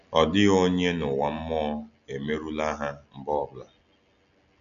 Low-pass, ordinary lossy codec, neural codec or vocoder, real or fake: 7.2 kHz; none; none; real